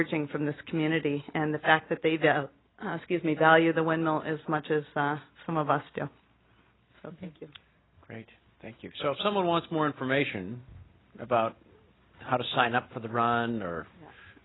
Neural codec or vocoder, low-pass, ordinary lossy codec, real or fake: none; 7.2 kHz; AAC, 16 kbps; real